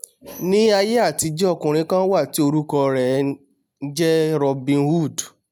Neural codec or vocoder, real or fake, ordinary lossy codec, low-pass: none; real; none; none